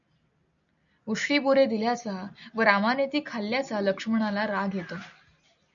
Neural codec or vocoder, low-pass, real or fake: none; 7.2 kHz; real